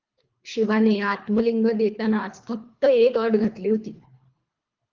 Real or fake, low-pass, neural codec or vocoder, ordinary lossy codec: fake; 7.2 kHz; codec, 24 kHz, 3 kbps, HILCodec; Opus, 32 kbps